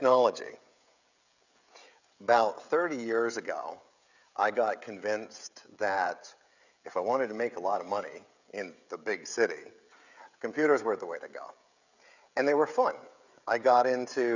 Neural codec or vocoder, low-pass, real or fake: codec, 16 kHz, 16 kbps, FreqCodec, smaller model; 7.2 kHz; fake